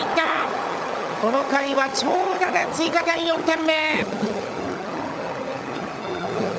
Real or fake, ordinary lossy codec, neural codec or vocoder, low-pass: fake; none; codec, 16 kHz, 16 kbps, FunCodec, trained on LibriTTS, 50 frames a second; none